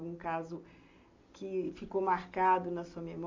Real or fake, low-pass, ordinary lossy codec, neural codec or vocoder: real; 7.2 kHz; MP3, 48 kbps; none